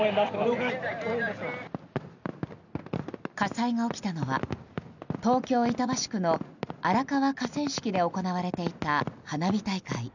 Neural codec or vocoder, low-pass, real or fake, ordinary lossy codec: none; 7.2 kHz; real; none